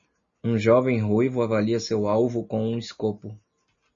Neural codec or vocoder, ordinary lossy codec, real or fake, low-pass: none; MP3, 32 kbps; real; 7.2 kHz